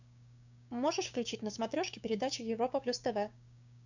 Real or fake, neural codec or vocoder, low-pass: fake; codec, 16 kHz, 6 kbps, DAC; 7.2 kHz